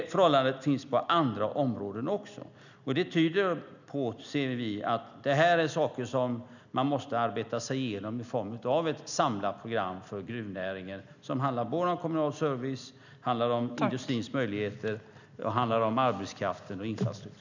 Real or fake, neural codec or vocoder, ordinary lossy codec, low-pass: real; none; none; 7.2 kHz